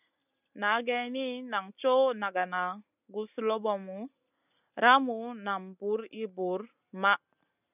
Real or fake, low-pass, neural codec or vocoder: real; 3.6 kHz; none